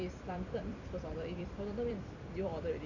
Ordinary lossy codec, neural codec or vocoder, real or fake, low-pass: none; vocoder, 44.1 kHz, 128 mel bands every 256 samples, BigVGAN v2; fake; 7.2 kHz